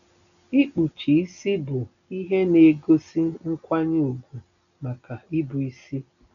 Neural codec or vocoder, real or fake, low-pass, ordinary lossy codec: none; real; 7.2 kHz; none